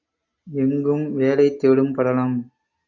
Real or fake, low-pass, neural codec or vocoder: real; 7.2 kHz; none